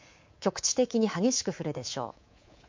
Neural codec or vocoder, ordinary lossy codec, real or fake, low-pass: codec, 24 kHz, 3.1 kbps, DualCodec; MP3, 48 kbps; fake; 7.2 kHz